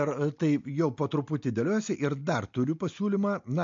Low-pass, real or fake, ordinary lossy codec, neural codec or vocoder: 7.2 kHz; real; MP3, 48 kbps; none